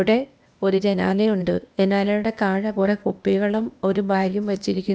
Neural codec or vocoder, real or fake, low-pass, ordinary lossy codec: codec, 16 kHz, 0.8 kbps, ZipCodec; fake; none; none